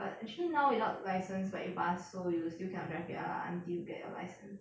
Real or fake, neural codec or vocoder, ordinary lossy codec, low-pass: real; none; none; none